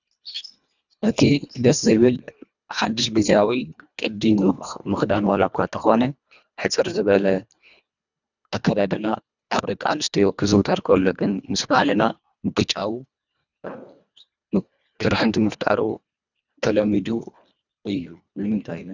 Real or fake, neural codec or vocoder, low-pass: fake; codec, 24 kHz, 1.5 kbps, HILCodec; 7.2 kHz